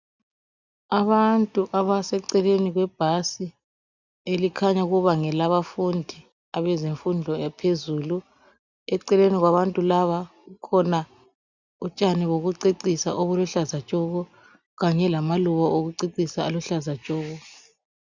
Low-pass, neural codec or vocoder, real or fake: 7.2 kHz; none; real